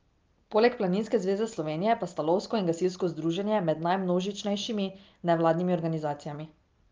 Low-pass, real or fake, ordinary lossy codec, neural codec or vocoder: 7.2 kHz; real; Opus, 24 kbps; none